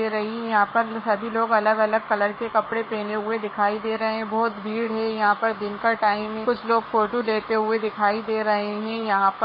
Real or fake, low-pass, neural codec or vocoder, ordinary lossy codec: fake; 5.4 kHz; codec, 44.1 kHz, 7.8 kbps, Pupu-Codec; MP3, 24 kbps